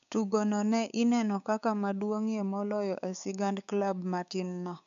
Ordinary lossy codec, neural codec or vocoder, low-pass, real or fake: none; codec, 16 kHz, 6 kbps, DAC; 7.2 kHz; fake